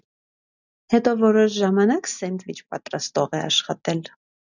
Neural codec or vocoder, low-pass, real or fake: none; 7.2 kHz; real